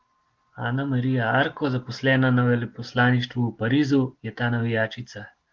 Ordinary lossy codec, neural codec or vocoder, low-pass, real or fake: Opus, 16 kbps; none; 7.2 kHz; real